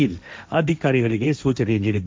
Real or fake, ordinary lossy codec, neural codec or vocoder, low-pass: fake; none; codec, 16 kHz, 1.1 kbps, Voila-Tokenizer; none